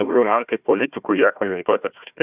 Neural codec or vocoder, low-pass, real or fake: codec, 16 kHz, 1 kbps, FunCodec, trained on Chinese and English, 50 frames a second; 3.6 kHz; fake